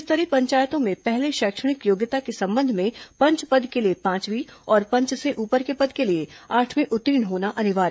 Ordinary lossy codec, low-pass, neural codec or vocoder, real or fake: none; none; codec, 16 kHz, 16 kbps, FreqCodec, smaller model; fake